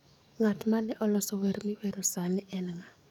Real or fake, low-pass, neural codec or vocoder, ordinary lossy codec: fake; 19.8 kHz; codec, 44.1 kHz, 7.8 kbps, DAC; none